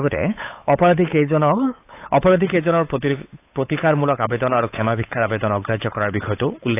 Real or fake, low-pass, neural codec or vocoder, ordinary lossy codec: fake; 3.6 kHz; codec, 16 kHz, 8 kbps, FunCodec, trained on Chinese and English, 25 frames a second; AAC, 24 kbps